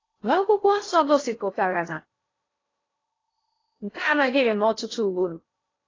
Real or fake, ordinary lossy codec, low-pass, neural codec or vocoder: fake; AAC, 32 kbps; 7.2 kHz; codec, 16 kHz in and 24 kHz out, 0.6 kbps, FocalCodec, streaming, 4096 codes